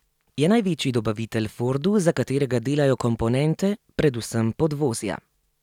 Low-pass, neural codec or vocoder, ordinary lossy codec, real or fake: 19.8 kHz; none; none; real